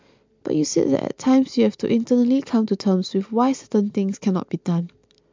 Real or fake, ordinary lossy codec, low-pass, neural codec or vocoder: real; MP3, 64 kbps; 7.2 kHz; none